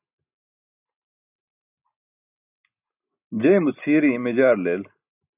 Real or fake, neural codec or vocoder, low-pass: real; none; 3.6 kHz